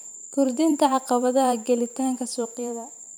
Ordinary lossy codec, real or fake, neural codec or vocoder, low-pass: none; fake; vocoder, 44.1 kHz, 128 mel bands every 512 samples, BigVGAN v2; none